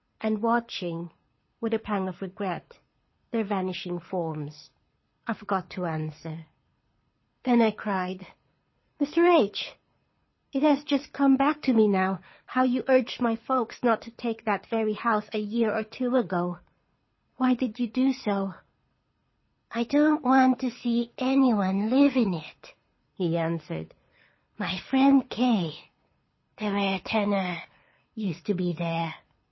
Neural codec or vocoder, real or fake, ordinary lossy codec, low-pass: codec, 24 kHz, 6 kbps, HILCodec; fake; MP3, 24 kbps; 7.2 kHz